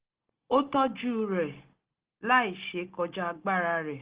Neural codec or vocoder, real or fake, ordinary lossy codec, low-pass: none; real; Opus, 16 kbps; 3.6 kHz